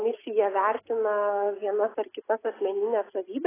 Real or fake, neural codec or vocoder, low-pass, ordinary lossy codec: real; none; 3.6 kHz; AAC, 16 kbps